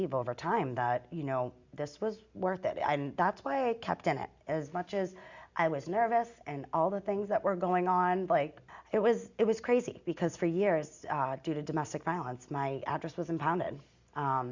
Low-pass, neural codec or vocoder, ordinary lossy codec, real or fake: 7.2 kHz; none; Opus, 64 kbps; real